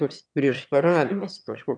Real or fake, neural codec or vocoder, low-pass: fake; autoencoder, 22.05 kHz, a latent of 192 numbers a frame, VITS, trained on one speaker; 9.9 kHz